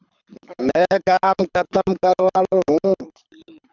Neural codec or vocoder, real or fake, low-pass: codec, 24 kHz, 6 kbps, HILCodec; fake; 7.2 kHz